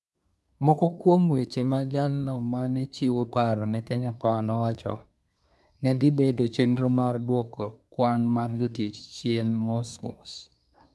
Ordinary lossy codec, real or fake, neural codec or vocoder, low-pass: none; fake; codec, 24 kHz, 1 kbps, SNAC; none